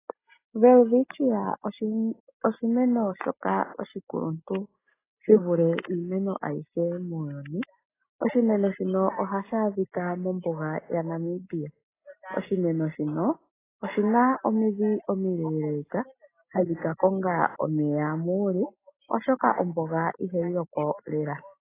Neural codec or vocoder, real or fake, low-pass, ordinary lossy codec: none; real; 3.6 kHz; AAC, 16 kbps